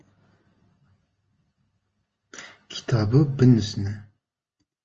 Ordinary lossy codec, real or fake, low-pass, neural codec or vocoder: Opus, 24 kbps; real; 7.2 kHz; none